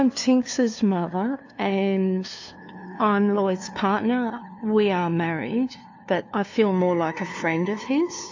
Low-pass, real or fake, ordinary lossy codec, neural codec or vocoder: 7.2 kHz; fake; AAC, 48 kbps; codec, 16 kHz, 2 kbps, FunCodec, trained on LibriTTS, 25 frames a second